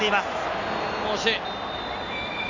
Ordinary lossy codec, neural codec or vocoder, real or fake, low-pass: none; none; real; 7.2 kHz